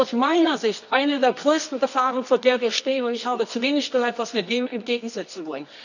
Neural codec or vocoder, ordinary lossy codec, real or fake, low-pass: codec, 24 kHz, 0.9 kbps, WavTokenizer, medium music audio release; none; fake; 7.2 kHz